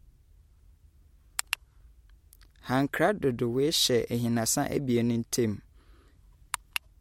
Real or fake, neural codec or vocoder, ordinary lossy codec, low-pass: real; none; MP3, 64 kbps; 19.8 kHz